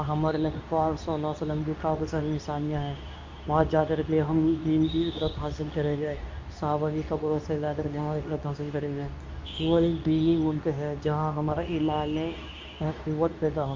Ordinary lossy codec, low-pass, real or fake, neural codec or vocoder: MP3, 48 kbps; 7.2 kHz; fake; codec, 24 kHz, 0.9 kbps, WavTokenizer, medium speech release version 2